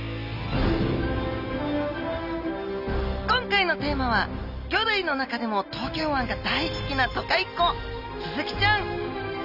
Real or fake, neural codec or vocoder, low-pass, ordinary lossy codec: real; none; 5.4 kHz; none